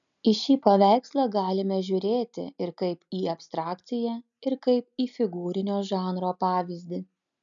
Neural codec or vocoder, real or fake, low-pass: none; real; 7.2 kHz